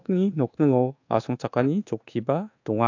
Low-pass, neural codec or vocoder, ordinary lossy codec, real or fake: 7.2 kHz; codec, 24 kHz, 1.2 kbps, DualCodec; AAC, 48 kbps; fake